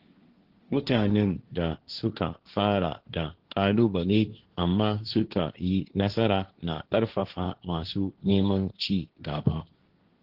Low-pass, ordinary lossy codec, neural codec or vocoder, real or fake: 5.4 kHz; Opus, 16 kbps; codec, 16 kHz, 1.1 kbps, Voila-Tokenizer; fake